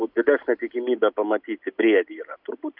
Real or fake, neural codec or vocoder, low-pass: real; none; 5.4 kHz